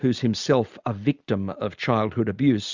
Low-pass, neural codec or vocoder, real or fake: 7.2 kHz; none; real